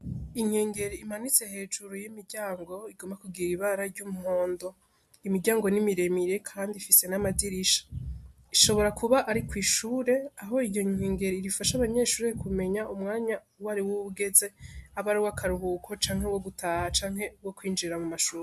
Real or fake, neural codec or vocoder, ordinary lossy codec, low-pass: real; none; MP3, 96 kbps; 14.4 kHz